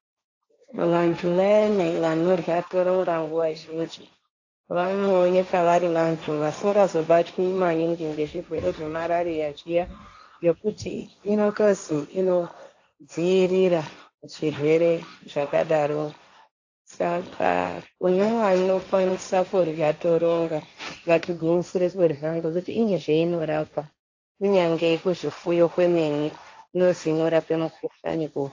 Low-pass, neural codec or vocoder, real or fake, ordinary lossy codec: 7.2 kHz; codec, 16 kHz, 1.1 kbps, Voila-Tokenizer; fake; AAC, 48 kbps